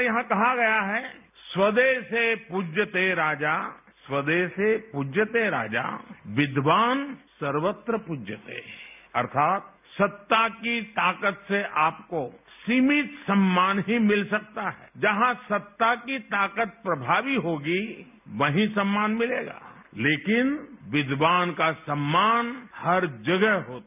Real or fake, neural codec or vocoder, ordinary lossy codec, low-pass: real; none; none; 3.6 kHz